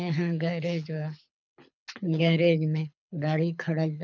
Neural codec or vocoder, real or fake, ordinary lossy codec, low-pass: codec, 24 kHz, 6 kbps, HILCodec; fake; none; 7.2 kHz